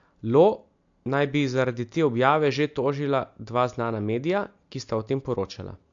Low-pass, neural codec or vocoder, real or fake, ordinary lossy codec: 7.2 kHz; none; real; AAC, 64 kbps